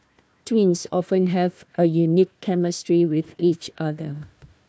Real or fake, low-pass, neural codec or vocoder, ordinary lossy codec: fake; none; codec, 16 kHz, 1 kbps, FunCodec, trained on Chinese and English, 50 frames a second; none